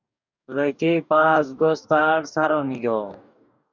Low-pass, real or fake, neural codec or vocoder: 7.2 kHz; fake; codec, 44.1 kHz, 2.6 kbps, DAC